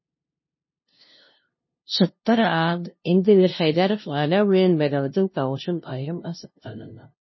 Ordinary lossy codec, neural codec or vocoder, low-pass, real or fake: MP3, 24 kbps; codec, 16 kHz, 0.5 kbps, FunCodec, trained on LibriTTS, 25 frames a second; 7.2 kHz; fake